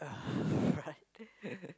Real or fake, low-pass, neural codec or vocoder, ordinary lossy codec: real; none; none; none